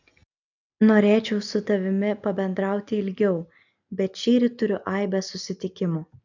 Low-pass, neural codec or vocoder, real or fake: 7.2 kHz; none; real